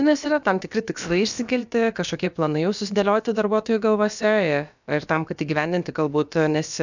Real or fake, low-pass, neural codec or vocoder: fake; 7.2 kHz; codec, 16 kHz, about 1 kbps, DyCAST, with the encoder's durations